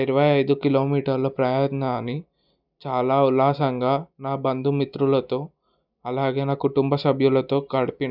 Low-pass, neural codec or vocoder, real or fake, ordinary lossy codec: 5.4 kHz; none; real; none